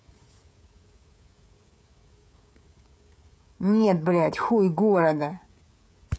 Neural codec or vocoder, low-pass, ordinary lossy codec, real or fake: codec, 16 kHz, 16 kbps, FreqCodec, smaller model; none; none; fake